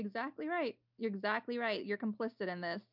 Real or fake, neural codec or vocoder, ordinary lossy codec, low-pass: real; none; MP3, 48 kbps; 5.4 kHz